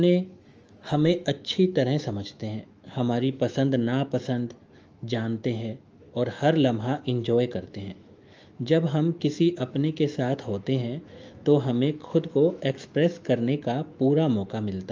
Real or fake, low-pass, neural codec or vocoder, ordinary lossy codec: fake; 7.2 kHz; autoencoder, 48 kHz, 128 numbers a frame, DAC-VAE, trained on Japanese speech; Opus, 24 kbps